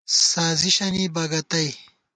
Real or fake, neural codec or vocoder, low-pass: real; none; 9.9 kHz